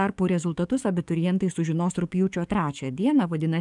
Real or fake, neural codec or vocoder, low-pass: fake; codec, 44.1 kHz, 7.8 kbps, DAC; 10.8 kHz